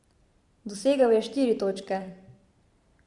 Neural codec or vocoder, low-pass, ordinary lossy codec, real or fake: vocoder, 44.1 kHz, 128 mel bands every 512 samples, BigVGAN v2; 10.8 kHz; Opus, 64 kbps; fake